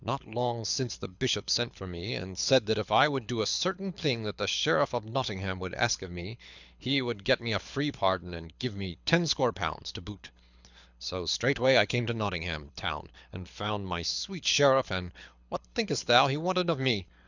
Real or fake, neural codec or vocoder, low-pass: fake; codec, 24 kHz, 6 kbps, HILCodec; 7.2 kHz